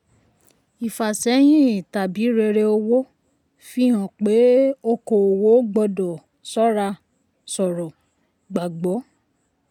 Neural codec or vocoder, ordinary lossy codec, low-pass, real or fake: none; none; 19.8 kHz; real